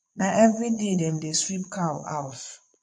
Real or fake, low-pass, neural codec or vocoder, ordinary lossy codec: fake; 9.9 kHz; vocoder, 22.05 kHz, 80 mel bands, Vocos; MP3, 48 kbps